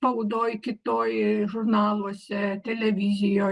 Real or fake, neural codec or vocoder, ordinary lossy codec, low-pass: real; none; Opus, 24 kbps; 10.8 kHz